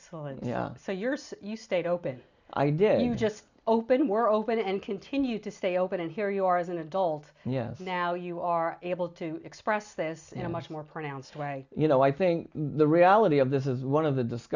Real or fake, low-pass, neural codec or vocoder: real; 7.2 kHz; none